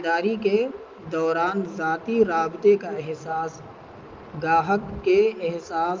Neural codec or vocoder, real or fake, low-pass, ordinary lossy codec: none; real; 7.2 kHz; Opus, 24 kbps